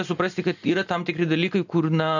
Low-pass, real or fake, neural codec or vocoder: 7.2 kHz; real; none